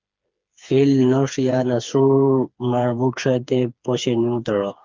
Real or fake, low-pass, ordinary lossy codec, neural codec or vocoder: fake; 7.2 kHz; Opus, 24 kbps; codec, 16 kHz, 4 kbps, FreqCodec, smaller model